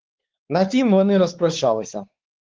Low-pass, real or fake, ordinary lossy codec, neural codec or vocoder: 7.2 kHz; fake; Opus, 16 kbps; codec, 16 kHz, 4 kbps, X-Codec, WavLM features, trained on Multilingual LibriSpeech